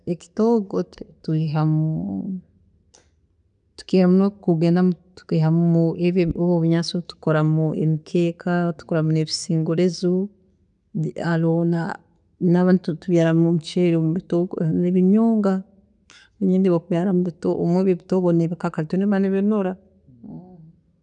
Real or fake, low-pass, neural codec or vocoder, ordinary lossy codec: real; 9.9 kHz; none; none